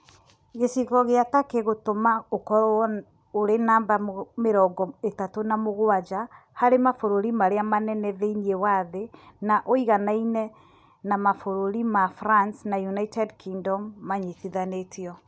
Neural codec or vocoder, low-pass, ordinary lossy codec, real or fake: none; none; none; real